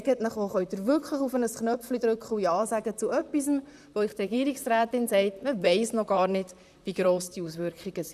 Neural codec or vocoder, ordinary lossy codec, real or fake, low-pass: vocoder, 44.1 kHz, 128 mel bands, Pupu-Vocoder; none; fake; 14.4 kHz